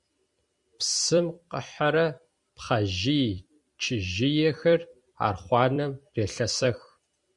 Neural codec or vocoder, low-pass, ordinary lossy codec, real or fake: vocoder, 44.1 kHz, 128 mel bands every 256 samples, BigVGAN v2; 10.8 kHz; Opus, 64 kbps; fake